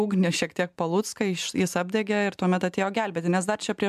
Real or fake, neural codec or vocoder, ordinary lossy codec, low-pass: real; none; MP3, 96 kbps; 14.4 kHz